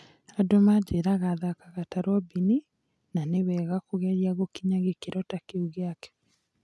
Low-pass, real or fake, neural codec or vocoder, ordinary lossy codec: none; real; none; none